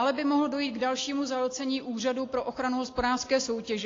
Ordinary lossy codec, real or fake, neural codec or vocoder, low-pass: AAC, 32 kbps; real; none; 7.2 kHz